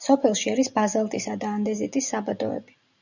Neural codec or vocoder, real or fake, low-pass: none; real; 7.2 kHz